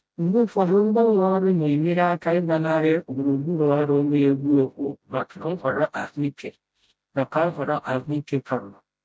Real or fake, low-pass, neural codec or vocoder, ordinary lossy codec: fake; none; codec, 16 kHz, 0.5 kbps, FreqCodec, smaller model; none